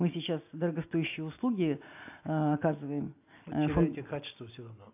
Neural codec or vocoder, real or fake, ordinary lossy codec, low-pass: none; real; AAC, 32 kbps; 3.6 kHz